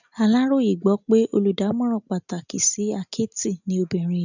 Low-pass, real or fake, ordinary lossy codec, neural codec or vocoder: 7.2 kHz; real; none; none